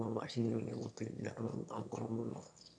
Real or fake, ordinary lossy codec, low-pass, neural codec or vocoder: fake; MP3, 64 kbps; 9.9 kHz; autoencoder, 22.05 kHz, a latent of 192 numbers a frame, VITS, trained on one speaker